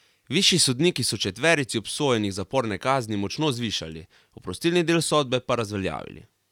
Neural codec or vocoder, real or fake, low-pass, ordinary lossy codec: none; real; 19.8 kHz; none